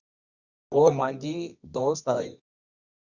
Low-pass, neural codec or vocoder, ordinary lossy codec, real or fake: 7.2 kHz; codec, 24 kHz, 0.9 kbps, WavTokenizer, medium music audio release; Opus, 64 kbps; fake